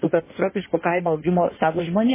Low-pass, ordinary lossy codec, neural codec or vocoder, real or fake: 3.6 kHz; MP3, 16 kbps; codec, 16 kHz in and 24 kHz out, 1.1 kbps, FireRedTTS-2 codec; fake